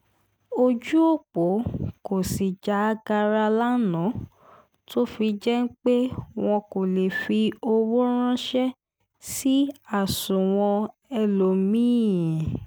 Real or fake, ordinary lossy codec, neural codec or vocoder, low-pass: real; none; none; none